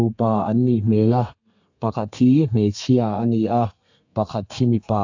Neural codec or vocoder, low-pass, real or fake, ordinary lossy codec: codec, 32 kHz, 1.9 kbps, SNAC; 7.2 kHz; fake; AAC, 48 kbps